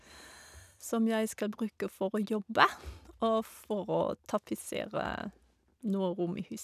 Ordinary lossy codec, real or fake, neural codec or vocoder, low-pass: none; real; none; 14.4 kHz